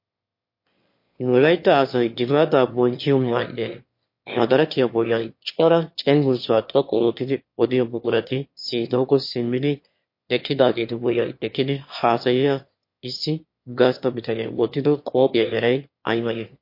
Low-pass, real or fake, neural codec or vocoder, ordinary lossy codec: 5.4 kHz; fake; autoencoder, 22.05 kHz, a latent of 192 numbers a frame, VITS, trained on one speaker; MP3, 32 kbps